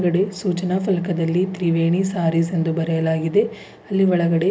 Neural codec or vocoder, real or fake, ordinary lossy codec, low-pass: none; real; none; none